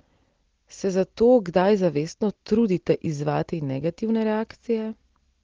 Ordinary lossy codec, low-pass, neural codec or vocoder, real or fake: Opus, 16 kbps; 7.2 kHz; none; real